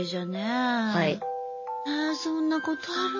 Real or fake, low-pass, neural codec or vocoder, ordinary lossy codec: real; 7.2 kHz; none; MP3, 32 kbps